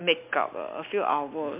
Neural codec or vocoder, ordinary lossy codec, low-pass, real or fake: none; MP3, 32 kbps; 3.6 kHz; real